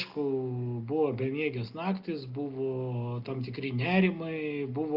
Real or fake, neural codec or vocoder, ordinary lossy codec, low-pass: real; none; Opus, 32 kbps; 5.4 kHz